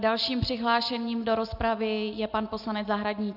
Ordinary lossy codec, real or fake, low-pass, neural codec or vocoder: AAC, 48 kbps; real; 5.4 kHz; none